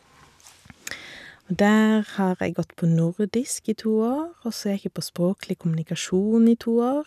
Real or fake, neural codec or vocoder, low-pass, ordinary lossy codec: real; none; 14.4 kHz; none